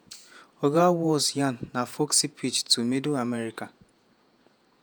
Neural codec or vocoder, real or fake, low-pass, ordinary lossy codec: vocoder, 48 kHz, 128 mel bands, Vocos; fake; none; none